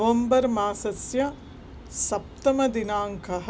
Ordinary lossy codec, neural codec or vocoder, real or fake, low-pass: none; none; real; none